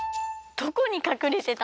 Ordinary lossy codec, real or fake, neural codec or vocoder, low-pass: none; real; none; none